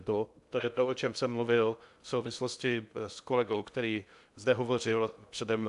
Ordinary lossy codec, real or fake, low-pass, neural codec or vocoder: AAC, 96 kbps; fake; 10.8 kHz; codec, 16 kHz in and 24 kHz out, 0.6 kbps, FocalCodec, streaming, 2048 codes